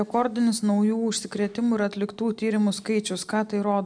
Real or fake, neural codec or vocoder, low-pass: real; none; 9.9 kHz